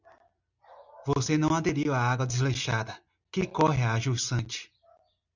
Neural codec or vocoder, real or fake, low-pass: none; real; 7.2 kHz